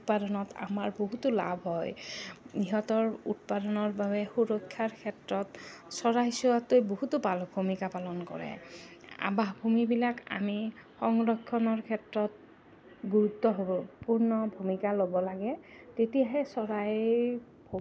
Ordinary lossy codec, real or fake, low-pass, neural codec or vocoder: none; real; none; none